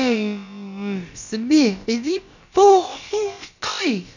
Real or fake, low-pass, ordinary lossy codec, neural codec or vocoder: fake; 7.2 kHz; none; codec, 16 kHz, about 1 kbps, DyCAST, with the encoder's durations